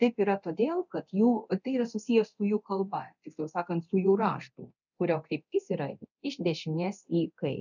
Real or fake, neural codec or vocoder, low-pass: fake; codec, 24 kHz, 0.9 kbps, DualCodec; 7.2 kHz